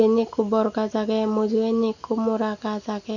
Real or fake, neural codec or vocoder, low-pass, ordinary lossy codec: real; none; 7.2 kHz; none